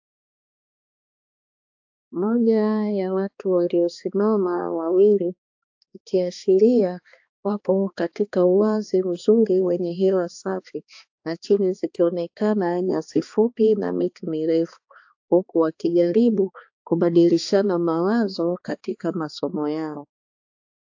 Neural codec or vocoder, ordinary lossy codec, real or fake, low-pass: codec, 16 kHz, 2 kbps, X-Codec, HuBERT features, trained on balanced general audio; AAC, 48 kbps; fake; 7.2 kHz